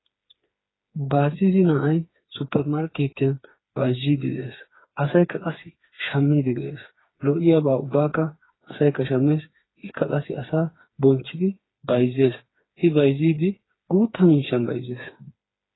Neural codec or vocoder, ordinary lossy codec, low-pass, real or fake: codec, 16 kHz, 4 kbps, FreqCodec, smaller model; AAC, 16 kbps; 7.2 kHz; fake